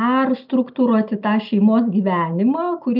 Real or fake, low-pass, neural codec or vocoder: real; 5.4 kHz; none